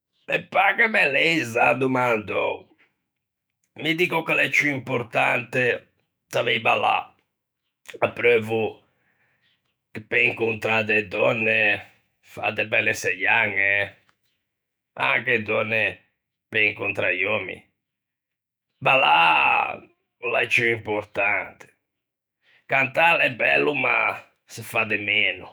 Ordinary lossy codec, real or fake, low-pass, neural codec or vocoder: none; fake; none; autoencoder, 48 kHz, 128 numbers a frame, DAC-VAE, trained on Japanese speech